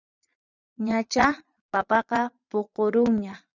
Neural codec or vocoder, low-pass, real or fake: vocoder, 22.05 kHz, 80 mel bands, Vocos; 7.2 kHz; fake